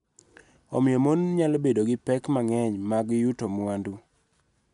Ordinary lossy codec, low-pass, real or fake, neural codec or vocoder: none; 10.8 kHz; real; none